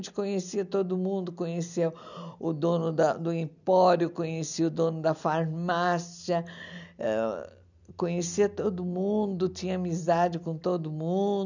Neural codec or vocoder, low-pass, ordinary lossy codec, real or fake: none; 7.2 kHz; none; real